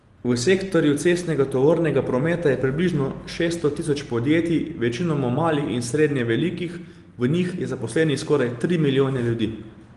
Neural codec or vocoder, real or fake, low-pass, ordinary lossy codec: none; real; 10.8 kHz; Opus, 24 kbps